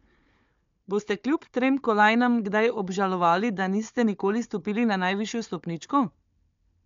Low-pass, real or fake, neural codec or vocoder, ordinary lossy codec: 7.2 kHz; fake; codec, 16 kHz, 4 kbps, FunCodec, trained on Chinese and English, 50 frames a second; MP3, 64 kbps